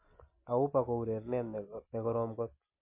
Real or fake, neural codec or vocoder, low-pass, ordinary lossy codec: real; none; 3.6 kHz; MP3, 24 kbps